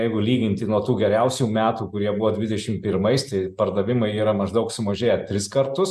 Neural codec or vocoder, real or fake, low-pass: none; real; 14.4 kHz